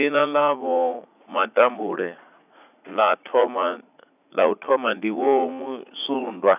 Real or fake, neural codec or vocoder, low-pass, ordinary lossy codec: fake; vocoder, 44.1 kHz, 80 mel bands, Vocos; 3.6 kHz; none